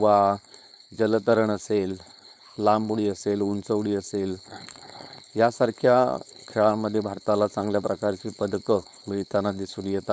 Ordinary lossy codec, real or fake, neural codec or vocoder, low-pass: none; fake; codec, 16 kHz, 4.8 kbps, FACodec; none